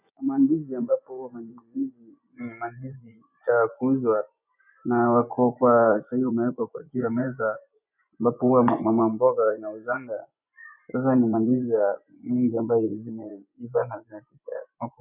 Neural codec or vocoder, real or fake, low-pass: vocoder, 24 kHz, 100 mel bands, Vocos; fake; 3.6 kHz